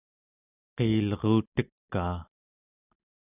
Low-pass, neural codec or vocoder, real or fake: 3.6 kHz; none; real